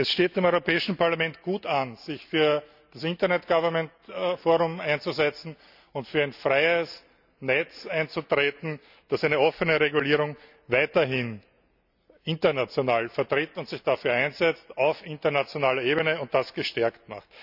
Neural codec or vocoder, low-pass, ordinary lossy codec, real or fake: none; 5.4 kHz; none; real